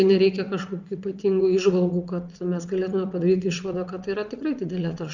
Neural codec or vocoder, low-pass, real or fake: vocoder, 22.05 kHz, 80 mel bands, WaveNeXt; 7.2 kHz; fake